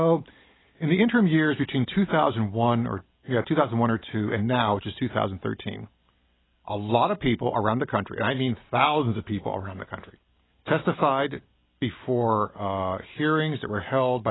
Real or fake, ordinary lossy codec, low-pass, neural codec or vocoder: real; AAC, 16 kbps; 7.2 kHz; none